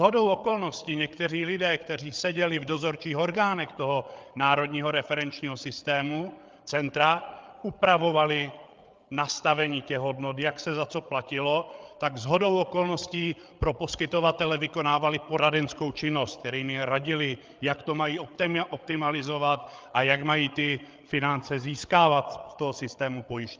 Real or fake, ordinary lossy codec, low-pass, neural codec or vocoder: fake; Opus, 24 kbps; 7.2 kHz; codec, 16 kHz, 8 kbps, FreqCodec, larger model